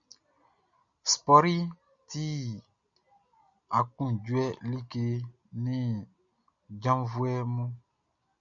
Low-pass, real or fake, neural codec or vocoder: 7.2 kHz; real; none